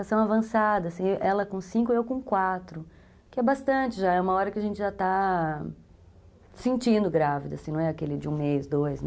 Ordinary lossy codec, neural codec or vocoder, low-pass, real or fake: none; none; none; real